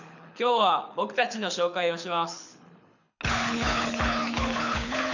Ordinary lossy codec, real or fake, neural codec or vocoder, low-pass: none; fake; codec, 24 kHz, 6 kbps, HILCodec; 7.2 kHz